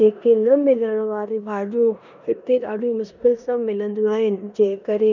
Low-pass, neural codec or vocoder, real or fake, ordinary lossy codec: 7.2 kHz; codec, 16 kHz in and 24 kHz out, 0.9 kbps, LongCat-Audio-Codec, four codebook decoder; fake; none